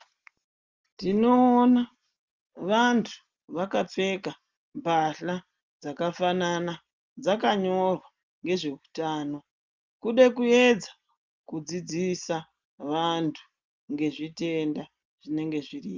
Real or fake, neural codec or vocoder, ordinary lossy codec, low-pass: real; none; Opus, 24 kbps; 7.2 kHz